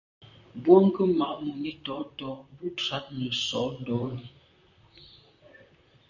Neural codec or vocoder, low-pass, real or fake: vocoder, 44.1 kHz, 128 mel bands, Pupu-Vocoder; 7.2 kHz; fake